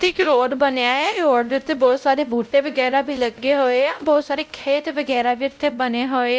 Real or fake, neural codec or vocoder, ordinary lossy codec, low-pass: fake; codec, 16 kHz, 0.5 kbps, X-Codec, WavLM features, trained on Multilingual LibriSpeech; none; none